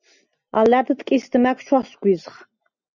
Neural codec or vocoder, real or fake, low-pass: none; real; 7.2 kHz